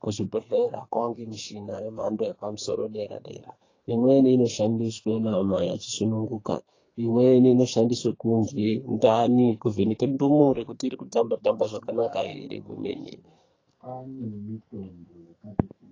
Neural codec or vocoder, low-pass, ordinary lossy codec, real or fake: codec, 44.1 kHz, 2.6 kbps, SNAC; 7.2 kHz; AAC, 32 kbps; fake